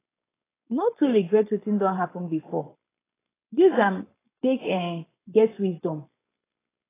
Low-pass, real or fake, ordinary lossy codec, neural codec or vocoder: 3.6 kHz; fake; AAC, 16 kbps; codec, 16 kHz, 4.8 kbps, FACodec